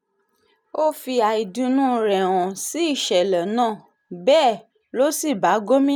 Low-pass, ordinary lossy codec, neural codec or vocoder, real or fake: none; none; none; real